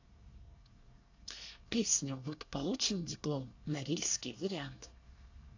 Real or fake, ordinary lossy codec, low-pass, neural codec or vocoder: fake; AAC, 48 kbps; 7.2 kHz; codec, 24 kHz, 1 kbps, SNAC